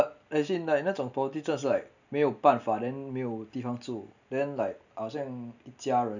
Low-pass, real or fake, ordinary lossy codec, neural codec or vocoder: 7.2 kHz; real; none; none